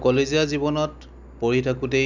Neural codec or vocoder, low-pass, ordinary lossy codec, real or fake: none; 7.2 kHz; none; real